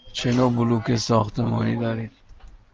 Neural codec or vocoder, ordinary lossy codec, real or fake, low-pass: none; Opus, 24 kbps; real; 7.2 kHz